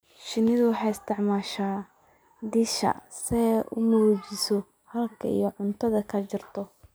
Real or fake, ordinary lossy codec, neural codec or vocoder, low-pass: real; none; none; none